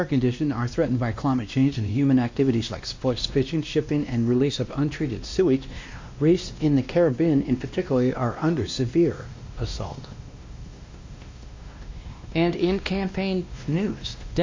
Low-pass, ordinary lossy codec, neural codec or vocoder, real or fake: 7.2 kHz; MP3, 48 kbps; codec, 16 kHz, 1 kbps, X-Codec, WavLM features, trained on Multilingual LibriSpeech; fake